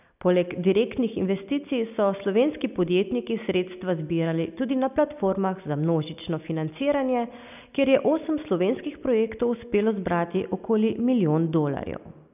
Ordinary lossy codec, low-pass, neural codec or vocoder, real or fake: none; 3.6 kHz; none; real